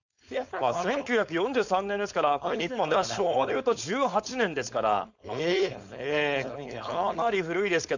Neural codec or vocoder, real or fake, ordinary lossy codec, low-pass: codec, 16 kHz, 4.8 kbps, FACodec; fake; none; 7.2 kHz